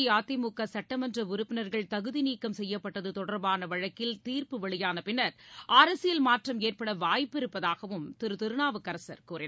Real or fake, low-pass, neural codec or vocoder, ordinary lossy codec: real; none; none; none